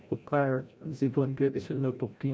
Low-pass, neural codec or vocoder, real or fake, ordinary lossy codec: none; codec, 16 kHz, 0.5 kbps, FreqCodec, larger model; fake; none